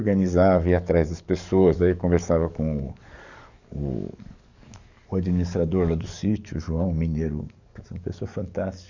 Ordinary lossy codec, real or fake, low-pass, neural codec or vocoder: none; fake; 7.2 kHz; codec, 44.1 kHz, 7.8 kbps, DAC